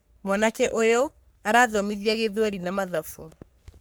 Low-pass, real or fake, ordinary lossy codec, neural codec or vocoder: none; fake; none; codec, 44.1 kHz, 3.4 kbps, Pupu-Codec